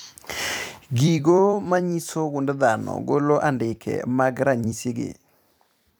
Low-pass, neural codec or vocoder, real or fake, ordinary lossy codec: none; vocoder, 44.1 kHz, 128 mel bands every 256 samples, BigVGAN v2; fake; none